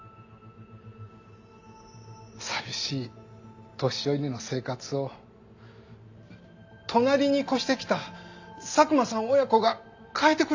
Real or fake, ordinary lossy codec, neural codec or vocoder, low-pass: real; AAC, 48 kbps; none; 7.2 kHz